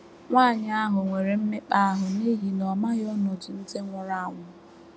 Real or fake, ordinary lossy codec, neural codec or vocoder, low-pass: real; none; none; none